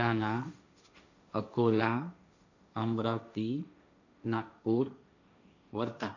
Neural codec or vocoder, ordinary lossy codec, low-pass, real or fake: codec, 16 kHz, 1.1 kbps, Voila-Tokenizer; none; 7.2 kHz; fake